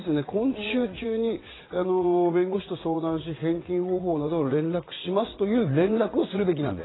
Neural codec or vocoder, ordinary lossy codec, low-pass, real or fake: vocoder, 22.05 kHz, 80 mel bands, WaveNeXt; AAC, 16 kbps; 7.2 kHz; fake